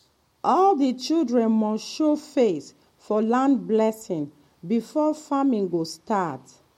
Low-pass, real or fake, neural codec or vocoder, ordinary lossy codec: 19.8 kHz; real; none; MP3, 64 kbps